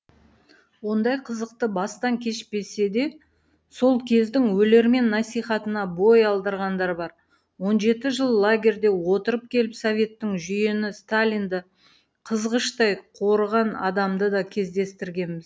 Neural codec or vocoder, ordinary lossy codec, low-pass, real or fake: none; none; none; real